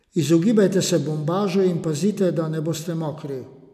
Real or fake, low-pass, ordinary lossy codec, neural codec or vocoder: real; 14.4 kHz; none; none